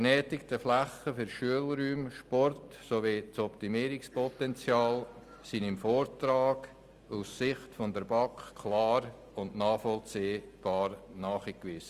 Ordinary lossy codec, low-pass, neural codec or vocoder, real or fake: Opus, 32 kbps; 14.4 kHz; none; real